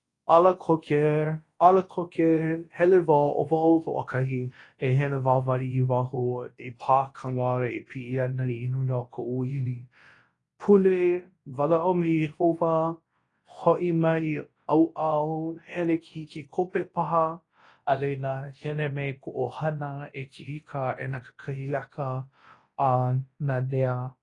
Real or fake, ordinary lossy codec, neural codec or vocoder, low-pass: fake; AAC, 32 kbps; codec, 24 kHz, 0.9 kbps, WavTokenizer, large speech release; 10.8 kHz